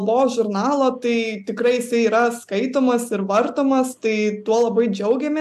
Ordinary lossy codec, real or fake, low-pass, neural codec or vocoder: AAC, 96 kbps; real; 14.4 kHz; none